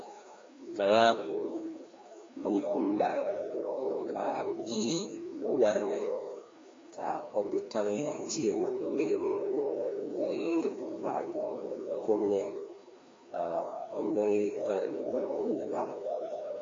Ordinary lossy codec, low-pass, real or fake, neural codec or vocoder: AAC, 32 kbps; 7.2 kHz; fake; codec, 16 kHz, 1 kbps, FreqCodec, larger model